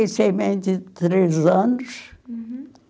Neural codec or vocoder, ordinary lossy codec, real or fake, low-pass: none; none; real; none